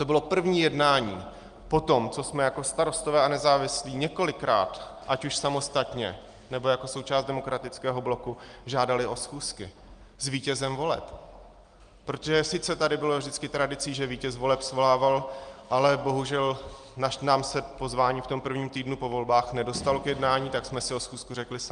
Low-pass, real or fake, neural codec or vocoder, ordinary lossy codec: 9.9 kHz; real; none; Opus, 32 kbps